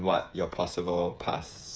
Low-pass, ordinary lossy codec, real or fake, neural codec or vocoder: none; none; fake; codec, 16 kHz, 8 kbps, FreqCodec, smaller model